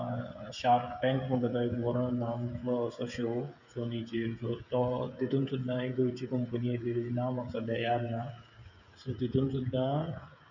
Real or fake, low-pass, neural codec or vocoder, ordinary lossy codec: fake; 7.2 kHz; codec, 16 kHz, 16 kbps, FreqCodec, smaller model; none